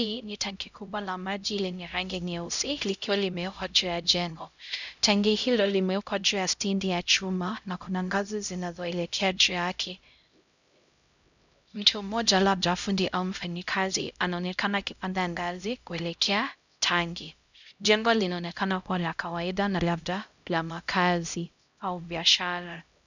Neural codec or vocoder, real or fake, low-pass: codec, 16 kHz, 0.5 kbps, X-Codec, HuBERT features, trained on LibriSpeech; fake; 7.2 kHz